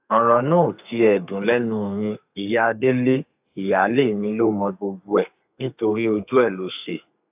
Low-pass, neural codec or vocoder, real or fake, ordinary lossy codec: 3.6 kHz; codec, 32 kHz, 1.9 kbps, SNAC; fake; AAC, 32 kbps